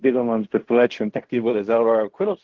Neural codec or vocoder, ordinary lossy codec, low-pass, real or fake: codec, 16 kHz in and 24 kHz out, 0.4 kbps, LongCat-Audio-Codec, fine tuned four codebook decoder; Opus, 16 kbps; 7.2 kHz; fake